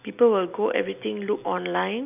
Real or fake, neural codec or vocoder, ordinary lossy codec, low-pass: real; none; none; 3.6 kHz